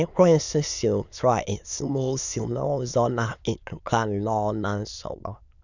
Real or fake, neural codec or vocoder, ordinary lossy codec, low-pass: fake; autoencoder, 22.05 kHz, a latent of 192 numbers a frame, VITS, trained on many speakers; none; 7.2 kHz